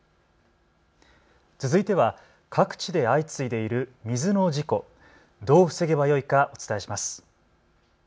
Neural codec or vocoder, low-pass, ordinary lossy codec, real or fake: none; none; none; real